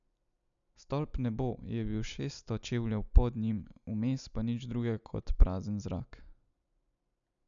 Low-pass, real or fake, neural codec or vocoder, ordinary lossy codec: 7.2 kHz; real; none; none